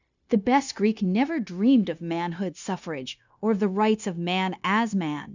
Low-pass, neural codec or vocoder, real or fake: 7.2 kHz; codec, 16 kHz, 0.9 kbps, LongCat-Audio-Codec; fake